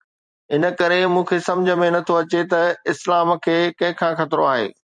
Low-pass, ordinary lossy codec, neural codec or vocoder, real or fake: 10.8 kHz; MP3, 96 kbps; vocoder, 44.1 kHz, 128 mel bands every 256 samples, BigVGAN v2; fake